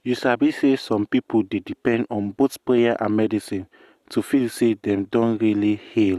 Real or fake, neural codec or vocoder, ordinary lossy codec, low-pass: real; none; Opus, 64 kbps; 14.4 kHz